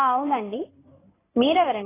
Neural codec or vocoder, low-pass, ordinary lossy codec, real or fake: codec, 16 kHz in and 24 kHz out, 2.2 kbps, FireRedTTS-2 codec; 3.6 kHz; AAC, 16 kbps; fake